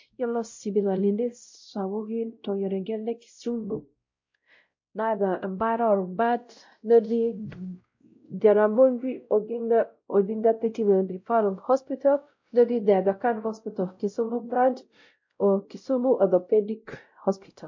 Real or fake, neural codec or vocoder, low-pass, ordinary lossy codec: fake; codec, 16 kHz, 0.5 kbps, X-Codec, WavLM features, trained on Multilingual LibriSpeech; 7.2 kHz; MP3, 48 kbps